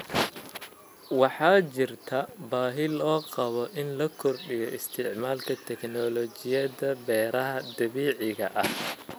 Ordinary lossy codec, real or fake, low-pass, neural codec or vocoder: none; real; none; none